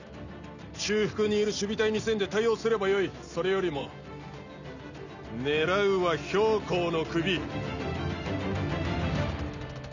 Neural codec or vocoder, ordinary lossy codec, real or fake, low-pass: none; none; real; 7.2 kHz